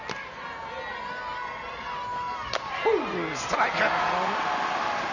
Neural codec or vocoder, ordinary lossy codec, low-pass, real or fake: none; none; 7.2 kHz; real